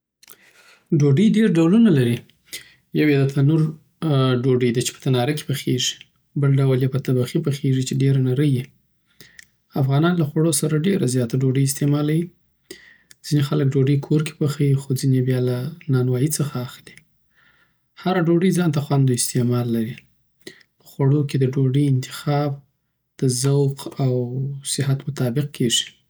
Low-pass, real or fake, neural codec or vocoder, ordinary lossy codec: none; real; none; none